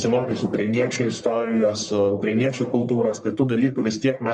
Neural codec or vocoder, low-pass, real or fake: codec, 44.1 kHz, 1.7 kbps, Pupu-Codec; 10.8 kHz; fake